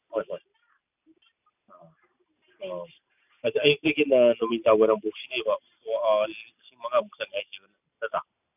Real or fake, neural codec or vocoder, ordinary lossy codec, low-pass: real; none; none; 3.6 kHz